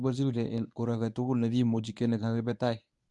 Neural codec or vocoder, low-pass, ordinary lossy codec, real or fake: codec, 24 kHz, 0.9 kbps, WavTokenizer, medium speech release version 1; 10.8 kHz; none; fake